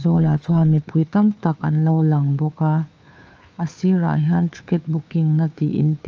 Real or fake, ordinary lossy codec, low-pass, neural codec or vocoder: fake; Opus, 24 kbps; 7.2 kHz; codec, 16 kHz, 2 kbps, FunCodec, trained on Chinese and English, 25 frames a second